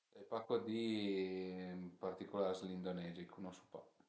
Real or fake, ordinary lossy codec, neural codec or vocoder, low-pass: real; none; none; none